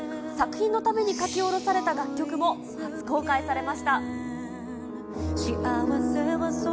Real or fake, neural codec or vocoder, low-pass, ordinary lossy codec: real; none; none; none